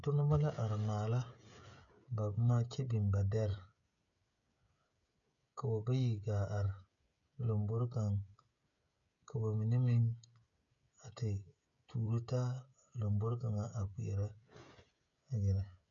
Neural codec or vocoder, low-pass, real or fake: codec, 16 kHz, 16 kbps, FreqCodec, smaller model; 7.2 kHz; fake